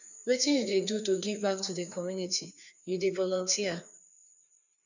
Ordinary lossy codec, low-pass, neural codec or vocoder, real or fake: none; 7.2 kHz; codec, 16 kHz, 2 kbps, FreqCodec, larger model; fake